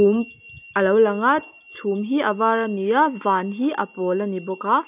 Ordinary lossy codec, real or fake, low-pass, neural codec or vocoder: none; real; 3.6 kHz; none